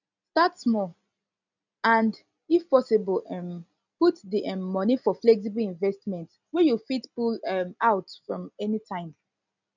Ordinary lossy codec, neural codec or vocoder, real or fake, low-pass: none; none; real; 7.2 kHz